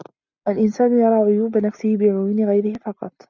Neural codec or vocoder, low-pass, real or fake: none; 7.2 kHz; real